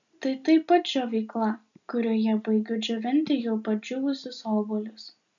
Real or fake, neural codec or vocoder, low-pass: real; none; 7.2 kHz